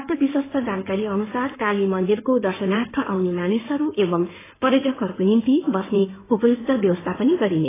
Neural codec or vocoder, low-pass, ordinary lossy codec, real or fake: codec, 16 kHz in and 24 kHz out, 2.2 kbps, FireRedTTS-2 codec; 3.6 kHz; AAC, 16 kbps; fake